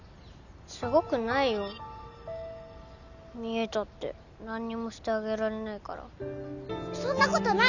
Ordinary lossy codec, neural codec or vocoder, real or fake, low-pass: none; none; real; 7.2 kHz